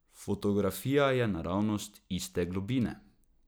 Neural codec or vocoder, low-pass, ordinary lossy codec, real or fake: none; none; none; real